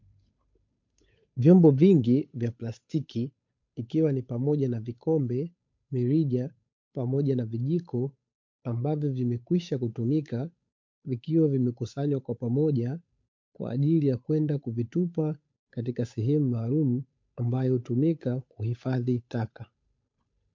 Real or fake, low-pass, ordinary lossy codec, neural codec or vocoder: fake; 7.2 kHz; MP3, 48 kbps; codec, 16 kHz, 8 kbps, FunCodec, trained on Chinese and English, 25 frames a second